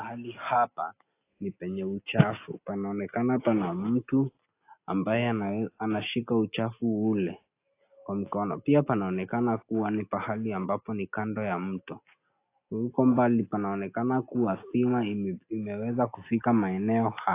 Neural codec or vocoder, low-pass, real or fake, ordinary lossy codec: none; 3.6 kHz; real; AAC, 24 kbps